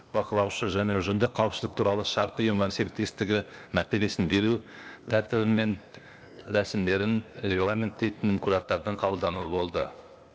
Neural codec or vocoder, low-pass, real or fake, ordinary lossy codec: codec, 16 kHz, 0.8 kbps, ZipCodec; none; fake; none